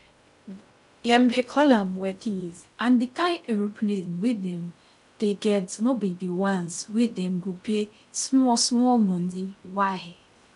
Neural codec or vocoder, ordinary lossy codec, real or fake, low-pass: codec, 16 kHz in and 24 kHz out, 0.6 kbps, FocalCodec, streaming, 4096 codes; none; fake; 10.8 kHz